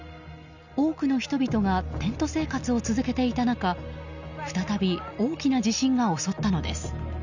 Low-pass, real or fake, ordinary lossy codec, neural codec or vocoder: 7.2 kHz; real; none; none